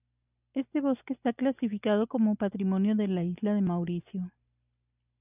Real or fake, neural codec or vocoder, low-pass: real; none; 3.6 kHz